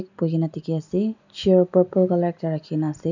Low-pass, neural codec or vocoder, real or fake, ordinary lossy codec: 7.2 kHz; none; real; none